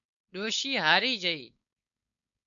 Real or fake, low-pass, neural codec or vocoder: fake; 7.2 kHz; codec, 16 kHz, 4.8 kbps, FACodec